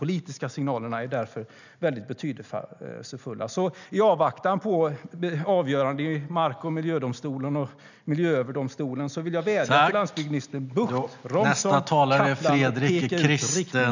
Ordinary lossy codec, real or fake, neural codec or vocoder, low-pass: none; real; none; 7.2 kHz